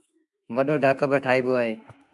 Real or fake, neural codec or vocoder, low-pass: fake; autoencoder, 48 kHz, 32 numbers a frame, DAC-VAE, trained on Japanese speech; 10.8 kHz